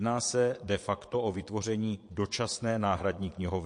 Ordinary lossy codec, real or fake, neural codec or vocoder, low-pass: MP3, 48 kbps; fake; vocoder, 22.05 kHz, 80 mel bands, Vocos; 9.9 kHz